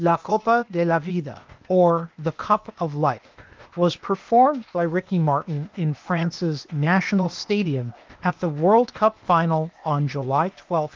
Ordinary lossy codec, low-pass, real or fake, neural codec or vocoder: Opus, 32 kbps; 7.2 kHz; fake; codec, 16 kHz, 0.8 kbps, ZipCodec